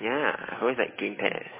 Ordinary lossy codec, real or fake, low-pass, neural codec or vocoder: MP3, 16 kbps; fake; 3.6 kHz; autoencoder, 48 kHz, 128 numbers a frame, DAC-VAE, trained on Japanese speech